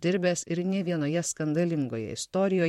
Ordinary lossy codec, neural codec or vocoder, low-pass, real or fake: MP3, 64 kbps; vocoder, 44.1 kHz, 128 mel bands, Pupu-Vocoder; 19.8 kHz; fake